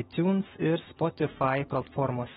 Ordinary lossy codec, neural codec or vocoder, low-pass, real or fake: AAC, 16 kbps; autoencoder, 48 kHz, 128 numbers a frame, DAC-VAE, trained on Japanese speech; 19.8 kHz; fake